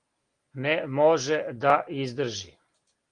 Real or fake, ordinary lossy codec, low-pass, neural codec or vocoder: real; Opus, 24 kbps; 9.9 kHz; none